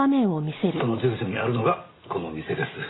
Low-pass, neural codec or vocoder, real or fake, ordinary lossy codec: 7.2 kHz; none; real; AAC, 16 kbps